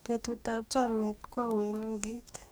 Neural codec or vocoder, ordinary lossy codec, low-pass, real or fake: codec, 44.1 kHz, 2.6 kbps, DAC; none; none; fake